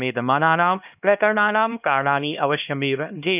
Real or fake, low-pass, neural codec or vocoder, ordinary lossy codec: fake; 3.6 kHz; codec, 16 kHz, 1 kbps, X-Codec, HuBERT features, trained on LibriSpeech; none